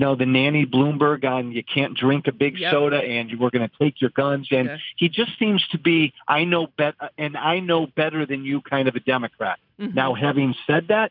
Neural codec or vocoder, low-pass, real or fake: none; 5.4 kHz; real